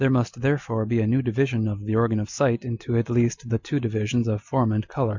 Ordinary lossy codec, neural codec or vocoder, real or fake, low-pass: Opus, 64 kbps; none; real; 7.2 kHz